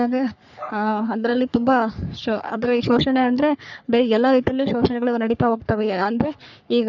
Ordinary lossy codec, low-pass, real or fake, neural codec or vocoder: none; 7.2 kHz; fake; codec, 44.1 kHz, 3.4 kbps, Pupu-Codec